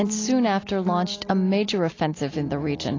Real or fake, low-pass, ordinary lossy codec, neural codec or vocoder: real; 7.2 kHz; AAC, 32 kbps; none